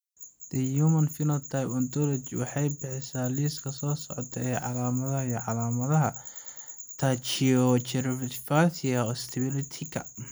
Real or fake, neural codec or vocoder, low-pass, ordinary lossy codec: real; none; none; none